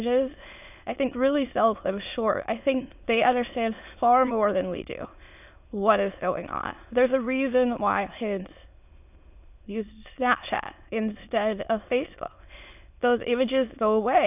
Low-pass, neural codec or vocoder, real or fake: 3.6 kHz; autoencoder, 22.05 kHz, a latent of 192 numbers a frame, VITS, trained on many speakers; fake